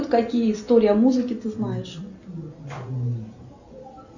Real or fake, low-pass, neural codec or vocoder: real; 7.2 kHz; none